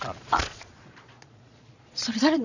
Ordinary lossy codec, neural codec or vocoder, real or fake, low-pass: none; vocoder, 22.05 kHz, 80 mel bands, Vocos; fake; 7.2 kHz